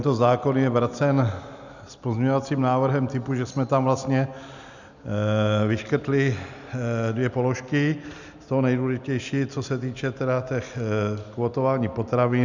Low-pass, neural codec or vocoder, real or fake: 7.2 kHz; none; real